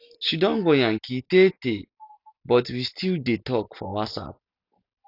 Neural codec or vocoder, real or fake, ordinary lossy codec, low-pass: none; real; none; 5.4 kHz